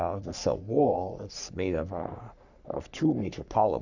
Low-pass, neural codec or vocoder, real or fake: 7.2 kHz; codec, 44.1 kHz, 3.4 kbps, Pupu-Codec; fake